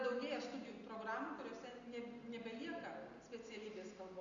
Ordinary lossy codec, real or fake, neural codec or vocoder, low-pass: Opus, 64 kbps; real; none; 7.2 kHz